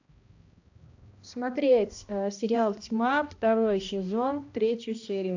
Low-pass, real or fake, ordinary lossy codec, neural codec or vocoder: 7.2 kHz; fake; AAC, 48 kbps; codec, 16 kHz, 1 kbps, X-Codec, HuBERT features, trained on balanced general audio